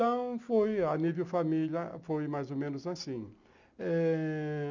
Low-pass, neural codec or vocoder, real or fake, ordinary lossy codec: 7.2 kHz; none; real; none